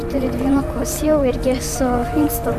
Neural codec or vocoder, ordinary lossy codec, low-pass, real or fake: vocoder, 44.1 kHz, 128 mel bands, Pupu-Vocoder; MP3, 96 kbps; 14.4 kHz; fake